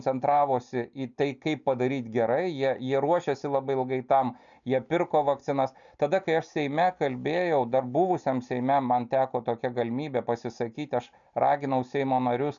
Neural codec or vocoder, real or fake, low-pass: none; real; 7.2 kHz